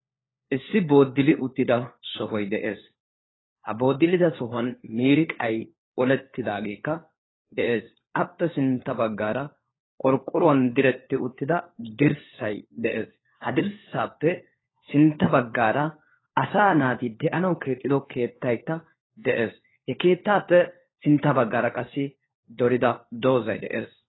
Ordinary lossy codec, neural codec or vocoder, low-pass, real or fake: AAC, 16 kbps; codec, 16 kHz, 4 kbps, FunCodec, trained on LibriTTS, 50 frames a second; 7.2 kHz; fake